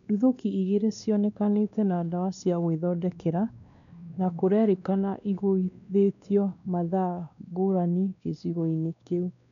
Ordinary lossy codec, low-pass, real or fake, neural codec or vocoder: none; 7.2 kHz; fake; codec, 16 kHz, 2 kbps, X-Codec, WavLM features, trained on Multilingual LibriSpeech